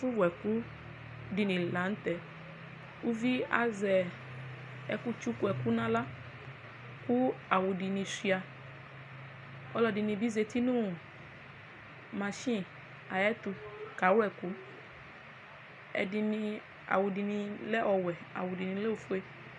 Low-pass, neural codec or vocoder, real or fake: 10.8 kHz; none; real